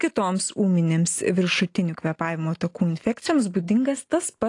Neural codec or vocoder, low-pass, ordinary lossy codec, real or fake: none; 10.8 kHz; AAC, 48 kbps; real